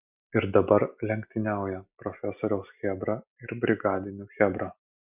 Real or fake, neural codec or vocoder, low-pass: real; none; 3.6 kHz